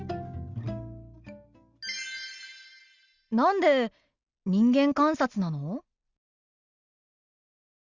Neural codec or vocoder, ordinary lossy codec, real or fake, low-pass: none; Opus, 64 kbps; real; 7.2 kHz